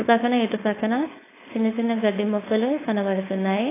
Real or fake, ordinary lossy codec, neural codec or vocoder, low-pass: fake; AAC, 16 kbps; codec, 16 kHz, 4.8 kbps, FACodec; 3.6 kHz